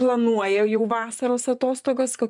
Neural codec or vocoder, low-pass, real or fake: vocoder, 24 kHz, 100 mel bands, Vocos; 10.8 kHz; fake